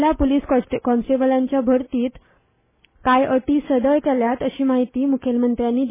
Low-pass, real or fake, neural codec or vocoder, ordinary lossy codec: 3.6 kHz; real; none; MP3, 16 kbps